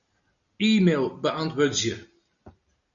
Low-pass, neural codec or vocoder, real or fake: 7.2 kHz; none; real